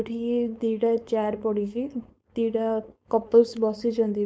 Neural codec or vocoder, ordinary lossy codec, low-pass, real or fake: codec, 16 kHz, 4.8 kbps, FACodec; none; none; fake